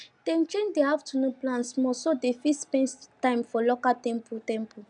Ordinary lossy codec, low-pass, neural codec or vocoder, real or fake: none; 9.9 kHz; none; real